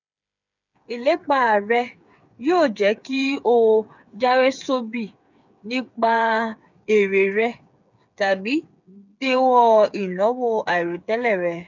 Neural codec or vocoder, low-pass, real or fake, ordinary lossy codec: codec, 16 kHz, 8 kbps, FreqCodec, smaller model; 7.2 kHz; fake; none